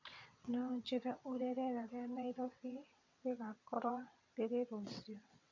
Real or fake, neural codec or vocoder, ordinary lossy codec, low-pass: fake; vocoder, 22.05 kHz, 80 mel bands, WaveNeXt; MP3, 64 kbps; 7.2 kHz